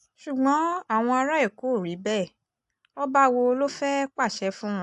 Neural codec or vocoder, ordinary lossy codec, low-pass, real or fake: none; AAC, 64 kbps; 10.8 kHz; real